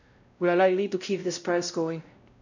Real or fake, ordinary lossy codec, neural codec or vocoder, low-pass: fake; none; codec, 16 kHz, 0.5 kbps, X-Codec, WavLM features, trained on Multilingual LibriSpeech; 7.2 kHz